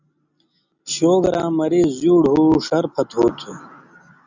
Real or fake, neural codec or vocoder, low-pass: real; none; 7.2 kHz